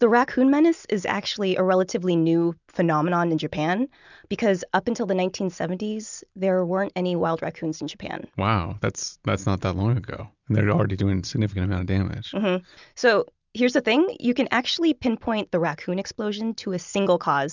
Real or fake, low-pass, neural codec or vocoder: real; 7.2 kHz; none